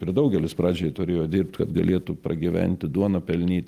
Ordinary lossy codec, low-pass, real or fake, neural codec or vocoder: Opus, 32 kbps; 14.4 kHz; real; none